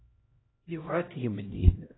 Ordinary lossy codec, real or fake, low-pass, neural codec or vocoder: AAC, 16 kbps; fake; 7.2 kHz; codec, 16 kHz, 0.5 kbps, X-Codec, HuBERT features, trained on LibriSpeech